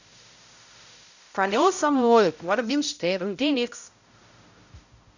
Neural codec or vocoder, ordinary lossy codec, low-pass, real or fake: codec, 16 kHz, 0.5 kbps, X-Codec, HuBERT features, trained on balanced general audio; none; 7.2 kHz; fake